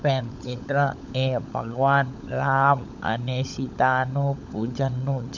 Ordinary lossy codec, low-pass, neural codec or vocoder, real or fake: none; 7.2 kHz; codec, 16 kHz, 16 kbps, FunCodec, trained on LibriTTS, 50 frames a second; fake